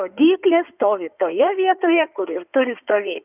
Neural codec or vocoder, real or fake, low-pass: codec, 16 kHz in and 24 kHz out, 2.2 kbps, FireRedTTS-2 codec; fake; 3.6 kHz